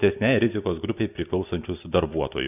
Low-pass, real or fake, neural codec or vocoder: 3.6 kHz; real; none